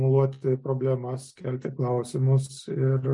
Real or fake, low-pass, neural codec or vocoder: real; 10.8 kHz; none